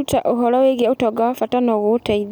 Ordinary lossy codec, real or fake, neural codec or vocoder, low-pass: none; real; none; none